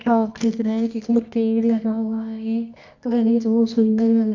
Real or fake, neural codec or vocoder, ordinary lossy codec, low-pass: fake; codec, 24 kHz, 0.9 kbps, WavTokenizer, medium music audio release; none; 7.2 kHz